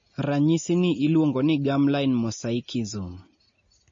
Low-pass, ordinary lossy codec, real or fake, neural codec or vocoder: 7.2 kHz; MP3, 32 kbps; real; none